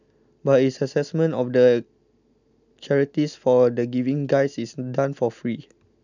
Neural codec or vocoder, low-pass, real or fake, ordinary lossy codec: none; 7.2 kHz; real; none